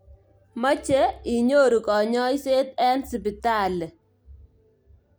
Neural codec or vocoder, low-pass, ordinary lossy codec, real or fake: none; none; none; real